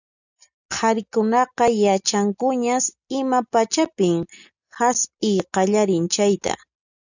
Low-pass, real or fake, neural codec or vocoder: 7.2 kHz; real; none